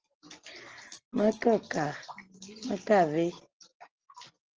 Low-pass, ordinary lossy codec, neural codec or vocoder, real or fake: 7.2 kHz; Opus, 16 kbps; none; real